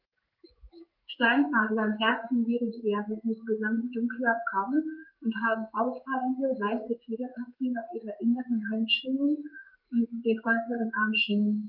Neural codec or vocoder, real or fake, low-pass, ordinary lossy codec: codec, 16 kHz in and 24 kHz out, 1 kbps, XY-Tokenizer; fake; 5.4 kHz; Opus, 32 kbps